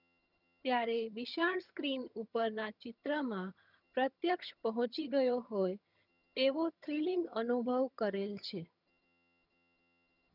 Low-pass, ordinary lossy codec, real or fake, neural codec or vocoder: 5.4 kHz; none; fake; vocoder, 22.05 kHz, 80 mel bands, HiFi-GAN